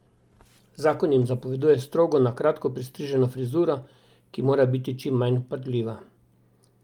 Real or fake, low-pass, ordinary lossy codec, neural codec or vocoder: real; 19.8 kHz; Opus, 32 kbps; none